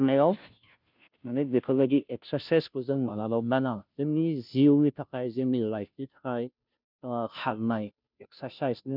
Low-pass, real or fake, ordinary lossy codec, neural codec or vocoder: 5.4 kHz; fake; none; codec, 16 kHz, 0.5 kbps, FunCodec, trained on Chinese and English, 25 frames a second